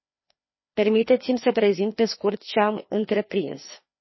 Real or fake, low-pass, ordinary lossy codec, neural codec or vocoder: fake; 7.2 kHz; MP3, 24 kbps; codec, 16 kHz, 2 kbps, FreqCodec, larger model